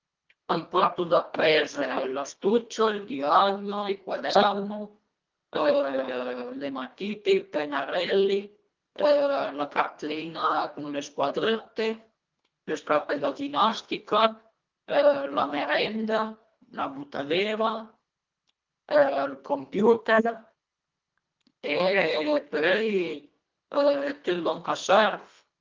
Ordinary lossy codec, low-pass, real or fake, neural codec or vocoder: Opus, 24 kbps; 7.2 kHz; fake; codec, 24 kHz, 1.5 kbps, HILCodec